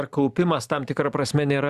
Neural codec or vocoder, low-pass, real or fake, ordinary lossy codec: vocoder, 44.1 kHz, 128 mel bands every 512 samples, BigVGAN v2; 14.4 kHz; fake; Opus, 64 kbps